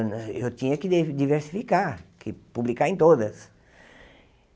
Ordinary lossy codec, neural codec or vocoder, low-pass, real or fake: none; none; none; real